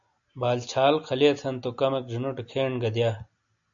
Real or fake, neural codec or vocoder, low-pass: real; none; 7.2 kHz